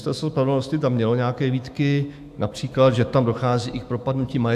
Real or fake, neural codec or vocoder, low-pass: fake; autoencoder, 48 kHz, 128 numbers a frame, DAC-VAE, trained on Japanese speech; 14.4 kHz